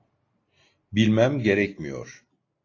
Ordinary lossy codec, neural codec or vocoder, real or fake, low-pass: AAC, 32 kbps; none; real; 7.2 kHz